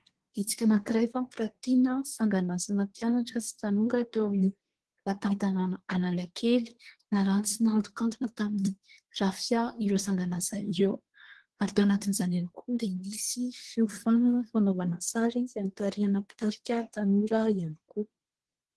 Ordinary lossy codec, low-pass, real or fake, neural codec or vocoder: Opus, 16 kbps; 10.8 kHz; fake; codec, 24 kHz, 1 kbps, SNAC